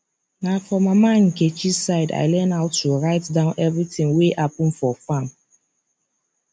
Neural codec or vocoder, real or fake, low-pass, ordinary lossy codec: none; real; none; none